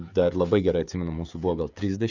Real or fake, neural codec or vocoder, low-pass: fake; codec, 16 kHz, 16 kbps, FreqCodec, smaller model; 7.2 kHz